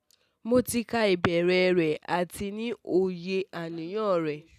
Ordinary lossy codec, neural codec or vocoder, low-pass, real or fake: none; none; 14.4 kHz; real